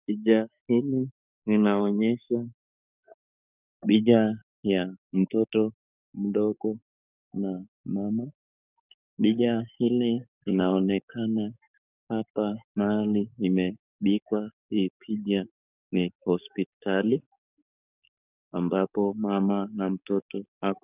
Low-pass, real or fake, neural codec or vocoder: 3.6 kHz; fake; codec, 44.1 kHz, 7.8 kbps, DAC